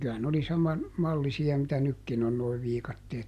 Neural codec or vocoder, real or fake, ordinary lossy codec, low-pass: none; real; none; 14.4 kHz